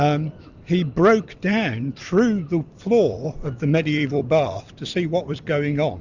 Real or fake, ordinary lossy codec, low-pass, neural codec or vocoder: fake; Opus, 64 kbps; 7.2 kHz; vocoder, 44.1 kHz, 128 mel bands every 256 samples, BigVGAN v2